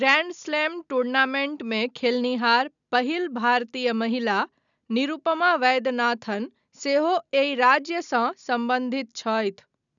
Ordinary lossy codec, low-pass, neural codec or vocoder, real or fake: none; 7.2 kHz; none; real